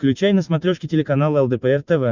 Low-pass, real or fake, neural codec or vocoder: 7.2 kHz; real; none